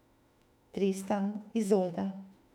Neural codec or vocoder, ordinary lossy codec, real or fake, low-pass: autoencoder, 48 kHz, 32 numbers a frame, DAC-VAE, trained on Japanese speech; none; fake; 19.8 kHz